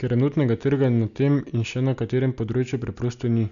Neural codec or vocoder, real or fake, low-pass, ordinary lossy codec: none; real; 7.2 kHz; none